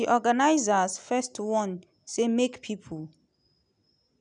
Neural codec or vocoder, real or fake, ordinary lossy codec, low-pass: none; real; none; 9.9 kHz